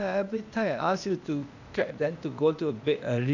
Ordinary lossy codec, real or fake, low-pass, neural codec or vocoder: none; fake; 7.2 kHz; codec, 16 kHz, 0.8 kbps, ZipCodec